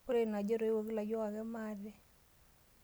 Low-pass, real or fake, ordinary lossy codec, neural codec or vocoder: none; real; none; none